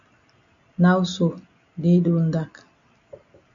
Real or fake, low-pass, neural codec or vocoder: real; 7.2 kHz; none